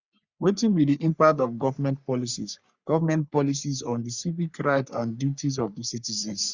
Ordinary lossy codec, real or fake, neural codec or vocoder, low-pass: Opus, 64 kbps; fake; codec, 44.1 kHz, 3.4 kbps, Pupu-Codec; 7.2 kHz